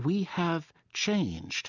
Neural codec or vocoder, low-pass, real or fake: none; 7.2 kHz; real